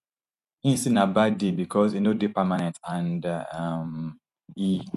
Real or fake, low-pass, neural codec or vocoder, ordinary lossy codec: fake; 14.4 kHz; vocoder, 44.1 kHz, 128 mel bands every 256 samples, BigVGAN v2; none